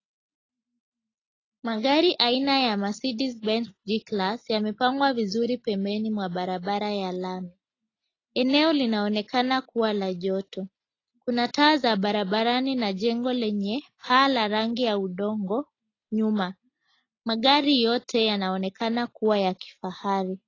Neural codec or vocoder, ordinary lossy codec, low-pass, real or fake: none; AAC, 32 kbps; 7.2 kHz; real